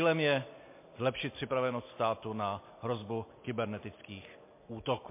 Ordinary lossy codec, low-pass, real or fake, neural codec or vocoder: MP3, 24 kbps; 3.6 kHz; real; none